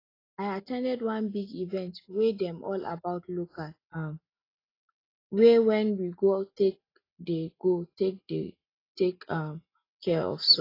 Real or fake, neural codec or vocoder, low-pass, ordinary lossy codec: real; none; 5.4 kHz; AAC, 24 kbps